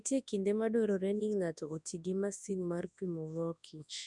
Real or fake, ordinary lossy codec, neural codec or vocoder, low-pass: fake; none; codec, 24 kHz, 0.9 kbps, WavTokenizer, large speech release; 10.8 kHz